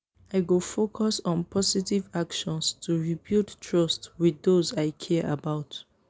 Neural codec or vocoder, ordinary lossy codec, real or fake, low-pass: none; none; real; none